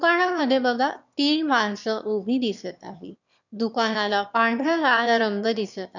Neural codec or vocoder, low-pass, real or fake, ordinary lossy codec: autoencoder, 22.05 kHz, a latent of 192 numbers a frame, VITS, trained on one speaker; 7.2 kHz; fake; none